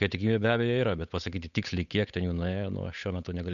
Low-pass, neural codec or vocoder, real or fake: 7.2 kHz; codec, 16 kHz, 8 kbps, FunCodec, trained on Chinese and English, 25 frames a second; fake